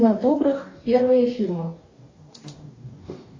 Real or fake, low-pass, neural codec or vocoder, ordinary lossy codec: fake; 7.2 kHz; codec, 44.1 kHz, 2.6 kbps, DAC; AAC, 32 kbps